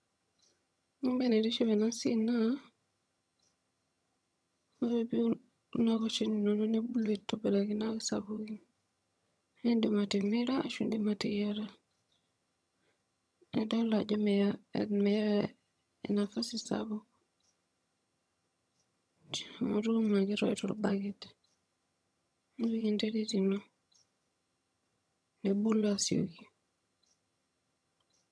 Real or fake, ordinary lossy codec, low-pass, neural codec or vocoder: fake; none; none; vocoder, 22.05 kHz, 80 mel bands, HiFi-GAN